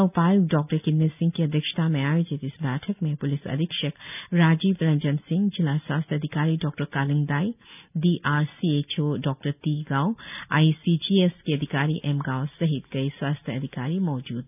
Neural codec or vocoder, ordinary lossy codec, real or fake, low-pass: none; none; real; 3.6 kHz